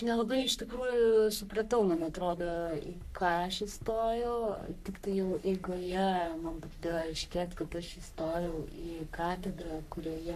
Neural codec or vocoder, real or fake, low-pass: codec, 44.1 kHz, 3.4 kbps, Pupu-Codec; fake; 14.4 kHz